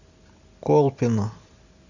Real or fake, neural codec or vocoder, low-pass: real; none; 7.2 kHz